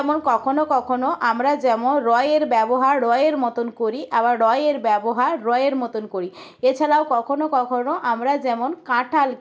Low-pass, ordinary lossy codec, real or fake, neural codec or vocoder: none; none; real; none